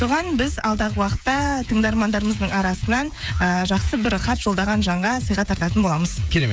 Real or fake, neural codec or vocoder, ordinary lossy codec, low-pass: fake; codec, 16 kHz, 16 kbps, FreqCodec, smaller model; none; none